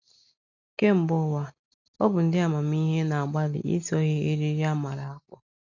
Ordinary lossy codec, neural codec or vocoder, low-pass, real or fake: none; none; 7.2 kHz; real